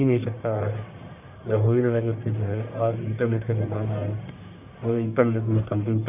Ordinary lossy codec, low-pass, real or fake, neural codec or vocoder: none; 3.6 kHz; fake; codec, 44.1 kHz, 1.7 kbps, Pupu-Codec